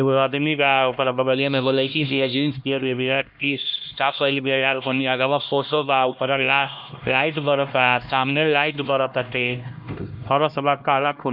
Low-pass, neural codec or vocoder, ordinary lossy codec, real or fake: 5.4 kHz; codec, 16 kHz, 1 kbps, X-Codec, HuBERT features, trained on LibriSpeech; none; fake